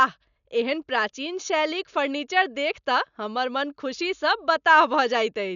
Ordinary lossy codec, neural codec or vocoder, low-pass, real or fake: none; none; 7.2 kHz; real